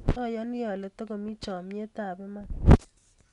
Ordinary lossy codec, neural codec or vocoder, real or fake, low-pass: none; none; real; 10.8 kHz